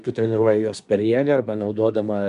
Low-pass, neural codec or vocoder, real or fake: 10.8 kHz; codec, 24 kHz, 1 kbps, SNAC; fake